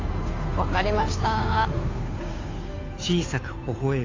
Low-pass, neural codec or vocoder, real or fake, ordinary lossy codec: 7.2 kHz; codec, 16 kHz, 2 kbps, FunCodec, trained on Chinese and English, 25 frames a second; fake; AAC, 32 kbps